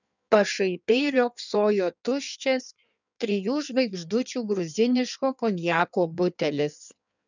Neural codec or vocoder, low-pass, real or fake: codec, 16 kHz in and 24 kHz out, 1.1 kbps, FireRedTTS-2 codec; 7.2 kHz; fake